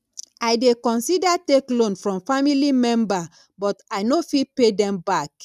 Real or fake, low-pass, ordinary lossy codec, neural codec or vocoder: real; 14.4 kHz; none; none